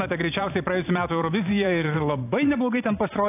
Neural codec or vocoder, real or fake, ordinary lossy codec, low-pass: vocoder, 44.1 kHz, 128 mel bands every 512 samples, BigVGAN v2; fake; AAC, 24 kbps; 3.6 kHz